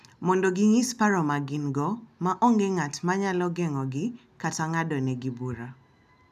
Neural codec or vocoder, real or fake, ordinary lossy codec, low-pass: none; real; none; 14.4 kHz